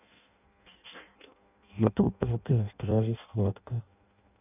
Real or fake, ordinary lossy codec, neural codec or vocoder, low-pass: fake; none; codec, 16 kHz in and 24 kHz out, 0.6 kbps, FireRedTTS-2 codec; 3.6 kHz